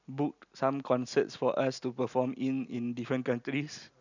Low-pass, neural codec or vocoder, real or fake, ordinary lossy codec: 7.2 kHz; none; real; none